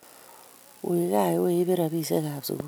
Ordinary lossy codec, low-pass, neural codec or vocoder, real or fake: none; none; none; real